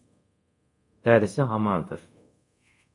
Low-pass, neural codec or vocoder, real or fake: 10.8 kHz; codec, 24 kHz, 0.5 kbps, DualCodec; fake